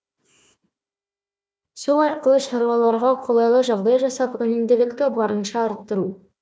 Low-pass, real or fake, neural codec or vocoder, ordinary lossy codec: none; fake; codec, 16 kHz, 1 kbps, FunCodec, trained on Chinese and English, 50 frames a second; none